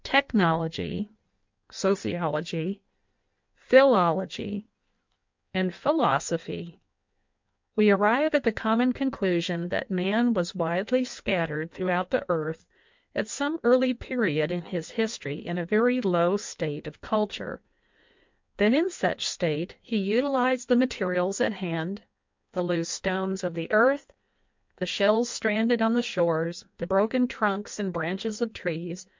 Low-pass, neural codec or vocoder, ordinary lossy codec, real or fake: 7.2 kHz; codec, 16 kHz in and 24 kHz out, 1.1 kbps, FireRedTTS-2 codec; MP3, 64 kbps; fake